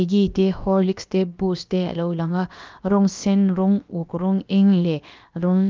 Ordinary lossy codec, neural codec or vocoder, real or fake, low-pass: Opus, 24 kbps; codec, 16 kHz, about 1 kbps, DyCAST, with the encoder's durations; fake; 7.2 kHz